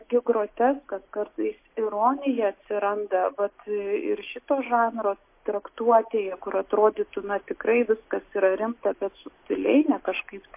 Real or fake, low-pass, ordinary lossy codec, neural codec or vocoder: real; 3.6 kHz; MP3, 24 kbps; none